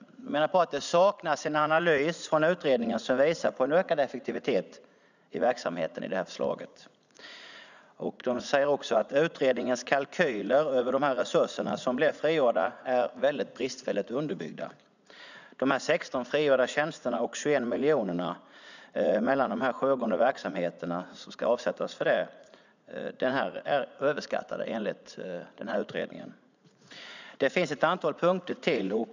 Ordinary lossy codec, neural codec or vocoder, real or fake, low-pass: none; vocoder, 44.1 kHz, 80 mel bands, Vocos; fake; 7.2 kHz